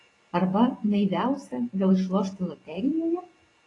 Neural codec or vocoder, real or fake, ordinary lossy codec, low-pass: vocoder, 44.1 kHz, 128 mel bands every 512 samples, BigVGAN v2; fake; AAC, 32 kbps; 10.8 kHz